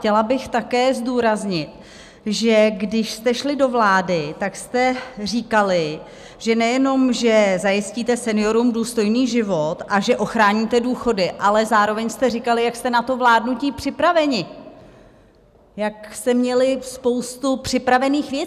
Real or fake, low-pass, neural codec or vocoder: real; 14.4 kHz; none